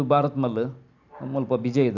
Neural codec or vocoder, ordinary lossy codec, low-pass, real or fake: none; none; 7.2 kHz; real